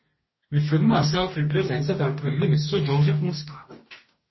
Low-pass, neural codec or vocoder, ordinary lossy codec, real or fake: 7.2 kHz; codec, 44.1 kHz, 2.6 kbps, DAC; MP3, 24 kbps; fake